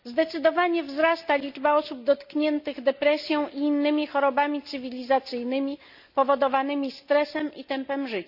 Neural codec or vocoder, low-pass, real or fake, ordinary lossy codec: none; 5.4 kHz; real; none